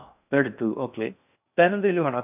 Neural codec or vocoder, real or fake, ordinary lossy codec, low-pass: codec, 16 kHz in and 24 kHz out, 0.6 kbps, FocalCodec, streaming, 4096 codes; fake; none; 3.6 kHz